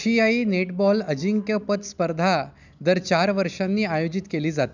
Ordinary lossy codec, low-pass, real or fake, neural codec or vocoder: none; 7.2 kHz; real; none